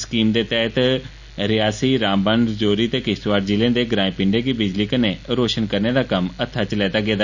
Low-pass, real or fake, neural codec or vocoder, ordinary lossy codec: 7.2 kHz; real; none; none